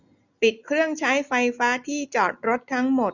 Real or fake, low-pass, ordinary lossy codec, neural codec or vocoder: real; 7.2 kHz; none; none